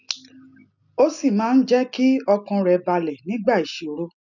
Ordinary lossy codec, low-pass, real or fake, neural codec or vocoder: none; 7.2 kHz; real; none